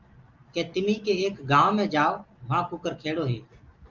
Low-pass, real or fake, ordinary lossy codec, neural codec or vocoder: 7.2 kHz; real; Opus, 32 kbps; none